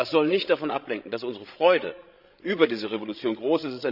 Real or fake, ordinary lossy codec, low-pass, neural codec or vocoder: fake; none; 5.4 kHz; codec, 16 kHz, 16 kbps, FreqCodec, larger model